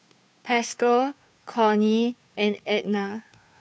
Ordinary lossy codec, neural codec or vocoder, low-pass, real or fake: none; codec, 16 kHz, 2 kbps, FunCodec, trained on Chinese and English, 25 frames a second; none; fake